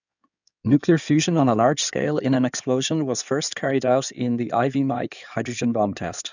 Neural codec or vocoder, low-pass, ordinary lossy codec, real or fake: codec, 16 kHz in and 24 kHz out, 2.2 kbps, FireRedTTS-2 codec; 7.2 kHz; none; fake